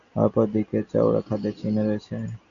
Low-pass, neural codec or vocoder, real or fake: 7.2 kHz; none; real